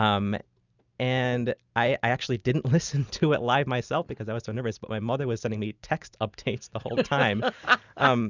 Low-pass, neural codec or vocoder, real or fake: 7.2 kHz; none; real